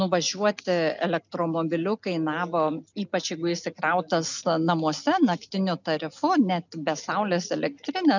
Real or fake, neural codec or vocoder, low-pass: real; none; 7.2 kHz